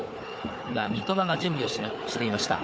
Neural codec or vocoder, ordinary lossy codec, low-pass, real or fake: codec, 16 kHz, 4 kbps, FunCodec, trained on Chinese and English, 50 frames a second; none; none; fake